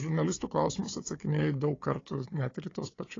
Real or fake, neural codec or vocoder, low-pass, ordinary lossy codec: fake; codec, 16 kHz, 4 kbps, FunCodec, trained on Chinese and English, 50 frames a second; 7.2 kHz; AAC, 32 kbps